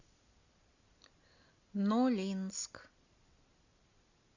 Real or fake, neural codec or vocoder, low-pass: real; none; 7.2 kHz